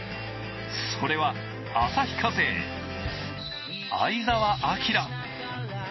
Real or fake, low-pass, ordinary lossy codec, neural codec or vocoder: real; 7.2 kHz; MP3, 24 kbps; none